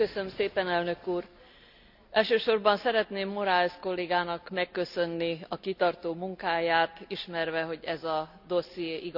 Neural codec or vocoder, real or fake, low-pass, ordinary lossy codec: none; real; 5.4 kHz; none